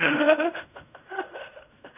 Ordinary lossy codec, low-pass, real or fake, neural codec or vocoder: none; 3.6 kHz; fake; codec, 16 kHz in and 24 kHz out, 0.4 kbps, LongCat-Audio-Codec, fine tuned four codebook decoder